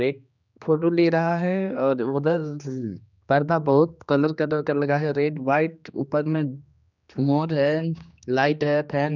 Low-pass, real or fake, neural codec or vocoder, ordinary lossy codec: 7.2 kHz; fake; codec, 16 kHz, 2 kbps, X-Codec, HuBERT features, trained on general audio; none